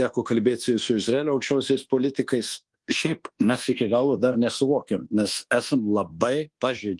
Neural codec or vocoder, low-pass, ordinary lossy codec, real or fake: codec, 24 kHz, 1.2 kbps, DualCodec; 10.8 kHz; Opus, 24 kbps; fake